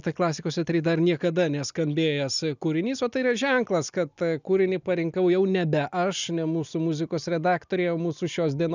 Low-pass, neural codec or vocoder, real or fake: 7.2 kHz; none; real